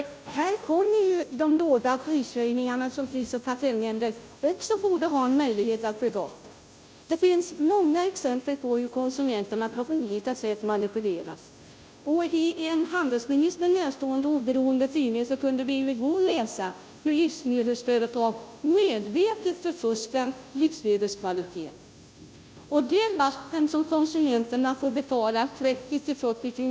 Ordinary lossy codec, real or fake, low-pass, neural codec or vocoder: none; fake; none; codec, 16 kHz, 0.5 kbps, FunCodec, trained on Chinese and English, 25 frames a second